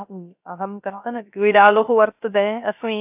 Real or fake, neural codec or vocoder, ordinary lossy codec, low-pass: fake; codec, 16 kHz, 0.3 kbps, FocalCodec; AAC, 32 kbps; 3.6 kHz